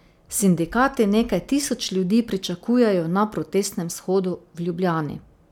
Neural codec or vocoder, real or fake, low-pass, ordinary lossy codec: none; real; 19.8 kHz; none